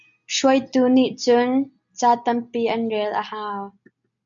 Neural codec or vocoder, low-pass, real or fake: none; 7.2 kHz; real